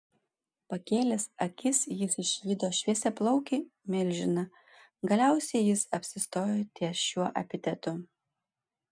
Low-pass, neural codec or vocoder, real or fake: 9.9 kHz; none; real